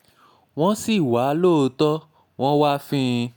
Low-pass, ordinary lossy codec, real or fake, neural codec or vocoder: none; none; real; none